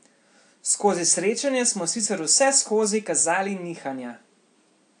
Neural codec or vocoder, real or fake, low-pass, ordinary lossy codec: none; real; 9.9 kHz; AAC, 64 kbps